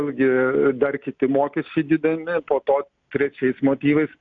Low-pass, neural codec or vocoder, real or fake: 7.2 kHz; none; real